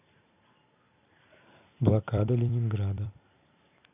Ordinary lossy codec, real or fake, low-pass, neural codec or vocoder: none; fake; 3.6 kHz; vocoder, 44.1 kHz, 128 mel bands every 256 samples, BigVGAN v2